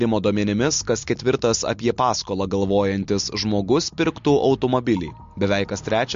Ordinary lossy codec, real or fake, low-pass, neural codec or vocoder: MP3, 48 kbps; real; 7.2 kHz; none